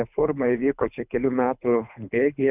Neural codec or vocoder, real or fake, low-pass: codec, 24 kHz, 3 kbps, HILCodec; fake; 3.6 kHz